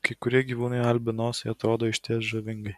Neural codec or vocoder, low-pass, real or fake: none; 14.4 kHz; real